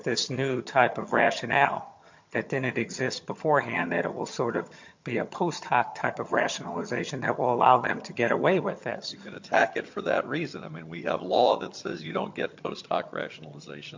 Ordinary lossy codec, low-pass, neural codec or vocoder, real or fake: MP3, 48 kbps; 7.2 kHz; vocoder, 22.05 kHz, 80 mel bands, HiFi-GAN; fake